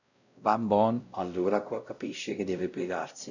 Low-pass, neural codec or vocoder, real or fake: 7.2 kHz; codec, 16 kHz, 0.5 kbps, X-Codec, WavLM features, trained on Multilingual LibriSpeech; fake